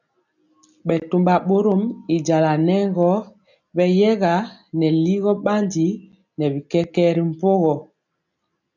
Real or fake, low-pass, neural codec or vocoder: real; 7.2 kHz; none